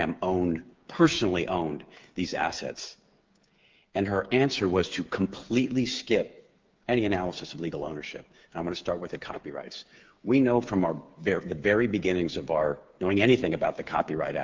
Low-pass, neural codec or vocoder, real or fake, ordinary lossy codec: 7.2 kHz; codec, 16 kHz, 8 kbps, FreqCodec, smaller model; fake; Opus, 32 kbps